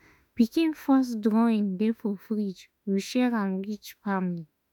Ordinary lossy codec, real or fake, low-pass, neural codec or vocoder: none; fake; 19.8 kHz; autoencoder, 48 kHz, 32 numbers a frame, DAC-VAE, trained on Japanese speech